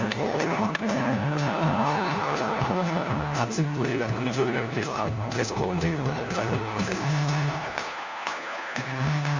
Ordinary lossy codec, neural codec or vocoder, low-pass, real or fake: Opus, 64 kbps; codec, 16 kHz, 1 kbps, FunCodec, trained on LibriTTS, 50 frames a second; 7.2 kHz; fake